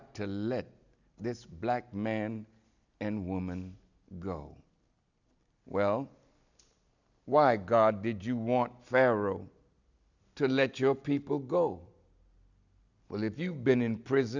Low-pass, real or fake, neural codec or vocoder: 7.2 kHz; real; none